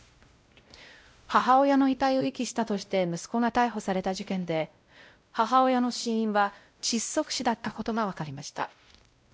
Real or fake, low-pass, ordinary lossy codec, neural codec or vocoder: fake; none; none; codec, 16 kHz, 0.5 kbps, X-Codec, WavLM features, trained on Multilingual LibriSpeech